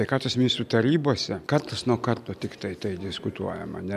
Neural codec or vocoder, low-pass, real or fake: none; 14.4 kHz; real